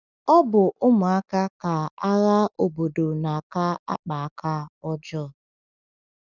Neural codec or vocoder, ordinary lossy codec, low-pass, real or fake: none; none; 7.2 kHz; real